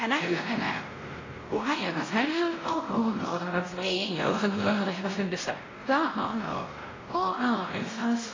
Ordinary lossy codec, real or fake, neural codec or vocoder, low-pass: AAC, 32 kbps; fake; codec, 16 kHz, 0.5 kbps, X-Codec, WavLM features, trained on Multilingual LibriSpeech; 7.2 kHz